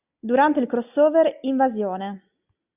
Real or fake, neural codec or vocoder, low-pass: real; none; 3.6 kHz